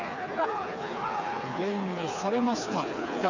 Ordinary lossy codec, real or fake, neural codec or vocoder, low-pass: none; fake; codec, 16 kHz, 4 kbps, FreqCodec, smaller model; 7.2 kHz